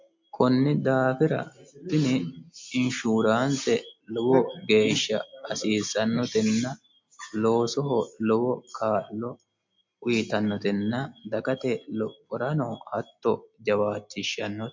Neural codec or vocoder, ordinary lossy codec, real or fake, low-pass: none; MP3, 48 kbps; real; 7.2 kHz